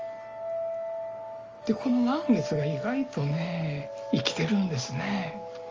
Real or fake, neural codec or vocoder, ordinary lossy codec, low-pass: real; none; Opus, 24 kbps; 7.2 kHz